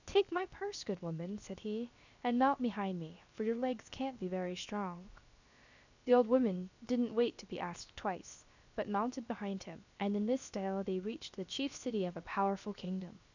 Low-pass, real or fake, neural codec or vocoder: 7.2 kHz; fake; codec, 16 kHz, 0.7 kbps, FocalCodec